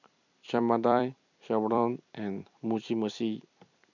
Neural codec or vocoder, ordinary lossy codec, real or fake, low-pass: vocoder, 44.1 kHz, 128 mel bands every 256 samples, BigVGAN v2; none; fake; 7.2 kHz